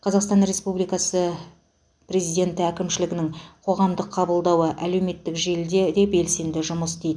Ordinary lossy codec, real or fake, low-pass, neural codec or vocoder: none; real; 9.9 kHz; none